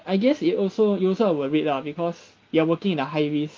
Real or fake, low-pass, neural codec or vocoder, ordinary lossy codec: real; 7.2 kHz; none; Opus, 32 kbps